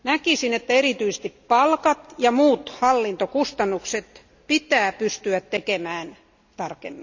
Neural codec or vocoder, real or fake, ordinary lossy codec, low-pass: none; real; none; 7.2 kHz